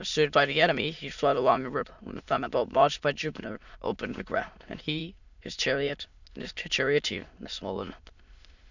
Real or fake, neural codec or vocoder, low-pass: fake; autoencoder, 22.05 kHz, a latent of 192 numbers a frame, VITS, trained on many speakers; 7.2 kHz